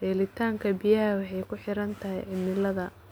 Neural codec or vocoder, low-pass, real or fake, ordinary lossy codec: none; none; real; none